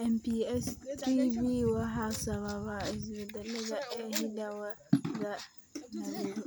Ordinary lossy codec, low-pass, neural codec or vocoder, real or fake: none; none; none; real